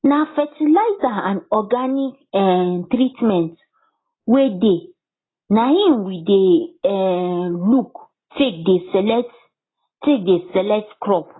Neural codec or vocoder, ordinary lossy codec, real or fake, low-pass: none; AAC, 16 kbps; real; 7.2 kHz